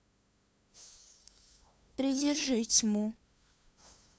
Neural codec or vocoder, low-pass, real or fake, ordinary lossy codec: codec, 16 kHz, 2 kbps, FunCodec, trained on LibriTTS, 25 frames a second; none; fake; none